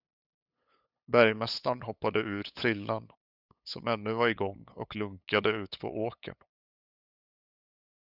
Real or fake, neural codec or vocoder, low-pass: fake; codec, 16 kHz, 8 kbps, FunCodec, trained on LibriTTS, 25 frames a second; 5.4 kHz